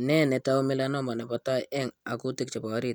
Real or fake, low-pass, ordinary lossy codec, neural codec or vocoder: real; none; none; none